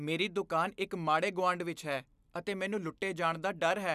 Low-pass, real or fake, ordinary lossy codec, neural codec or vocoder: 14.4 kHz; real; none; none